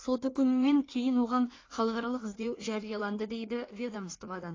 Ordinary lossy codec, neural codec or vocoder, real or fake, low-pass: AAC, 32 kbps; codec, 16 kHz in and 24 kHz out, 1.1 kbps, FireRedTTS-2 codec; fake; 7.2 kHz